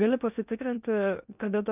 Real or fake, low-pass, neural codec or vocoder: fake; 3.6 kHz; codec, 16 kHz in and 24 kHz out, 0.6 kbps, FocalCodec, streaming, 2048 codes